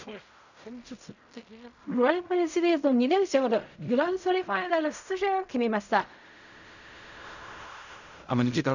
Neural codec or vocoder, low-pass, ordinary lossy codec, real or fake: codec, 16 kHz in and 24 kHz out, 0.4 kbps, LongCat-Audio-Codec, fine tuned four codebook decoder; 7.2 kHz; none; fake